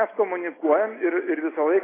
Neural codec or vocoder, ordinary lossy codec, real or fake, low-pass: none; AAC, 16 kbps; real; 3.6 kHz